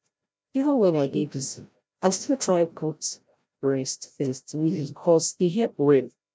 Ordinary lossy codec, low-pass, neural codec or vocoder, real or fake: none; none; codec, 16 kHz, 0.5 kbps, FreqCodec, larger model; fake